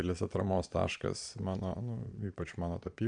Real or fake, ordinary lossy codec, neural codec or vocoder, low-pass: fake; AAC, 64 kbps; vocoder, 22.05 kHz, 80 mel bands, Vocos; 9.9 kHz